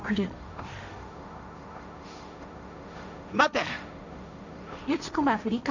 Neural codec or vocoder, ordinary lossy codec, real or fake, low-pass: codec, 16 kHz, 1.1 kbps, Voila-Tokenizer; none; fake; 7.2 kHz